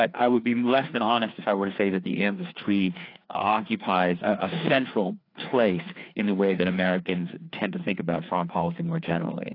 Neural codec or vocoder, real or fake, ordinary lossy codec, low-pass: codec, 16 kHz, 2 kbps, FreqCodec, larger model; fake; AAC, 32 kbps; 5.4 kHz